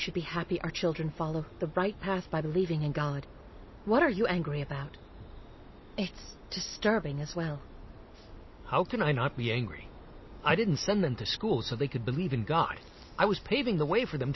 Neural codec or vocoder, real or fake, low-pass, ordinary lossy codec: none; real; 7.2 kHz; MP3, 24 kbps